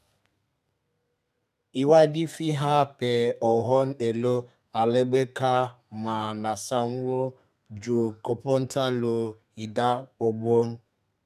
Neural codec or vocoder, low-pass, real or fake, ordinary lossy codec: codec, 32 kHz, 1.9 kbps, SNAC; 14.4 kHz; fake; none